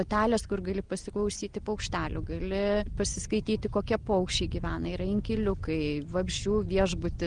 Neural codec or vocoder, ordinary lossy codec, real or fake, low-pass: none; Opus, 24 kbps; real; 9.9 kHz